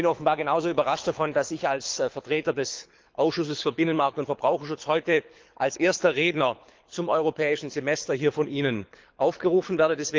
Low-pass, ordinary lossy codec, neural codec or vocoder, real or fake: 7.2 kHz; Opus, 32 kbps; codec, 24 kHz, 6 kbps, HILCodec; fake